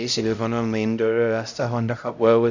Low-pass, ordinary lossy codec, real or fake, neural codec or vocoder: 7.2 kHz; none; fake; codec, 16 kHz, 0.5 kbps, X-Codec, HuBERT features, trained on LibriSpeech